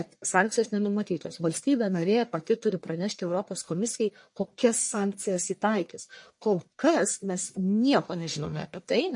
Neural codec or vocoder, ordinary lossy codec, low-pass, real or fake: codec, 44.1 kHz, 1.7 kbps, Pupu-Codec; MP3, 48 kbps; 10.8 kHz; fake